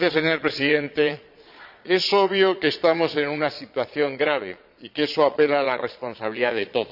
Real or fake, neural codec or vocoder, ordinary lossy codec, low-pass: fake; vocoder, 22.05 kHz, 80 mel bands, Vocos; none; 5.4 kHz